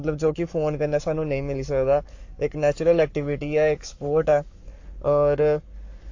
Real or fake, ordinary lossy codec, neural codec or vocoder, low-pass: fake; AAC, 48 kbps; codec, 44.1 kHz, 7.8 kbps, Pupu-Codec; 7.2 kHz